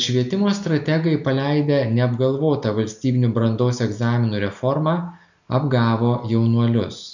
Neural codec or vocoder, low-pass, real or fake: none; 7.2 kHz; real